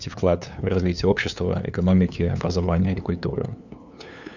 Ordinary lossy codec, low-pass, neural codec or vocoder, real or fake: none; 7.2 kHz; codec, 16 kHz, 2 kbps, FunCodec, trained on LibriTTS, 25 frames a second; fake